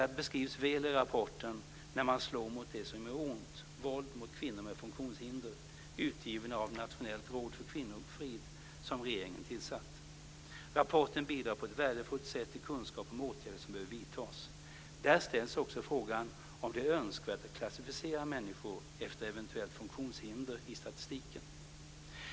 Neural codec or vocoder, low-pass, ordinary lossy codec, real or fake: none; none; none; real